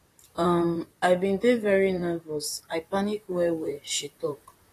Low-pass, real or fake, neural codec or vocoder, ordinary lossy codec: 14.4 kHz; fake; vocoder, 44.1 kHz, 128 mel bands, Pupu-Vocoder; AAC, 48 kbps